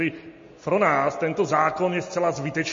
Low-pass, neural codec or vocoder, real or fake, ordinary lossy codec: 7.2 kHz; none; real; MP3, 32 kbps